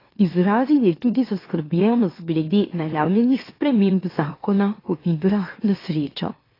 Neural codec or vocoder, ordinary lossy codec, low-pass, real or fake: autoencoder, 44.1 kHz, a latent of 192 numbers a frame, MeloTTS; AAC, 24 kbps; 5.4 kHz; fake